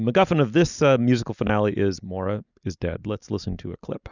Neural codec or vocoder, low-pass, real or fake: none; 7.2 kHz; real